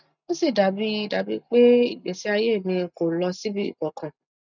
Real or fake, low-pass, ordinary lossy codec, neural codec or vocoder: real; 7.2 kHz; none; none